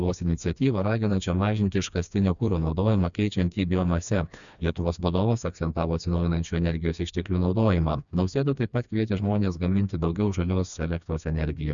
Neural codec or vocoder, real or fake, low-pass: codec, 16 kHz, 2 kbps, FreqCodec, smaller model; fake; 7.2 kHz